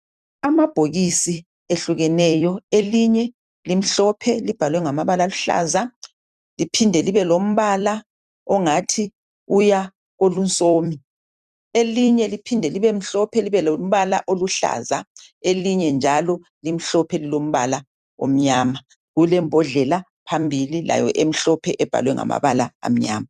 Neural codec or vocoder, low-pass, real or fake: vocoder, 44.1 kHz, 128 mel bands every 256 samples, BigVGAN v2; 14.4 kHz; fake